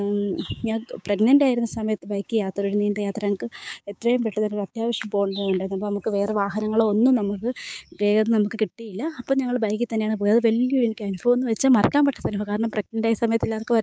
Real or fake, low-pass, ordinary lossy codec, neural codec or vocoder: fake; none; none; codec, 16 kHz, 6 kbps, DAC